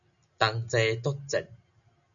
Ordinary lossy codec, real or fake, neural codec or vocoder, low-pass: MP3, 96 kbps; real; none; 7.2 kHz